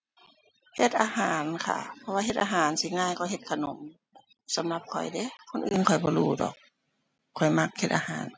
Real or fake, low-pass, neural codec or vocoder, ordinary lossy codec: real; none; none; none